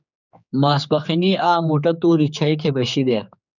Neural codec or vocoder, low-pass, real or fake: codec, 16 kHz, 4 kbps, X-Codec, HuBERT features, trained on general audio; 7.2 kHz; fake